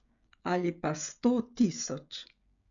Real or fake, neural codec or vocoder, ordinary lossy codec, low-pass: fake; codec, 16 kHz, 16 kbps, FreqCodec, smaller model; none; 7.2 kHz